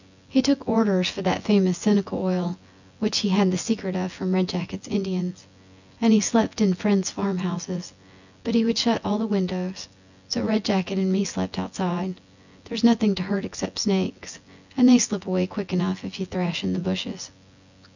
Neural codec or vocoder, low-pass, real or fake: vocoder, 24 kHz, 100 mel bands, Vocos; 7.2 kHz; fake